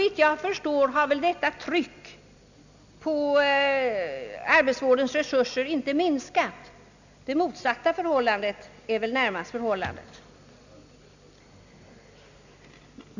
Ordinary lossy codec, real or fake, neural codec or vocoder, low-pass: none; real; none; 7.2 kHz